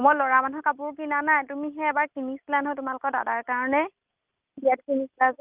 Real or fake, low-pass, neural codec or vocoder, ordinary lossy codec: real; 3.6 kHz; none; Opus, 24 kbps